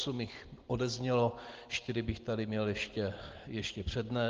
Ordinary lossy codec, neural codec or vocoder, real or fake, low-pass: Opus, 16 kbps; none; real; 7.2 kHz